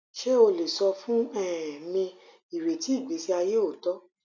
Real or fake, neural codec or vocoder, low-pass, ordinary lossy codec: real; none; 7.2 kHz; none